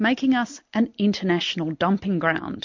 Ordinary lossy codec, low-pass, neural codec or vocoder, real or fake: MP3, 48 kbps; 7.2 kHz; none; real